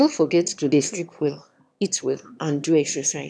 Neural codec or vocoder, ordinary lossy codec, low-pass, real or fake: autoencoder, 22.05 kHz, a latent of 192 numbers a frame, VITS, trained on one speaker; none; none; fake